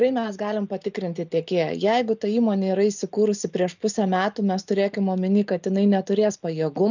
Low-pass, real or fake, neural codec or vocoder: 7.2 kHz; real; none